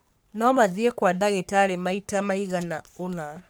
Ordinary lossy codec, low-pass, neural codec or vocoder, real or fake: none; none; codec, 44.1 kHz, 3.4 kbps, Pupu-Codec; fake